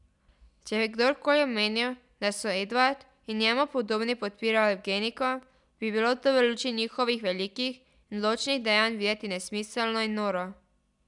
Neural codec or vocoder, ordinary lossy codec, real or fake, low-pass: none; none; real; 10.8 kHz